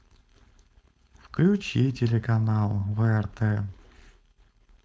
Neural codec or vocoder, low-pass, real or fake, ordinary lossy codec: codec, 16 kHz, 4.8 kbps, FACodec; none; fake; none